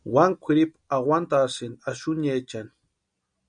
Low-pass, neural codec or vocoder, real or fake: 9.9 kHz; none; real